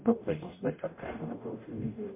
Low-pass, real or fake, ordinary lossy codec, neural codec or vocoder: 3.6 kHz; fake; MP3, 24 kbps; codec, 44.1 kHz, 0.9 kbps, DAC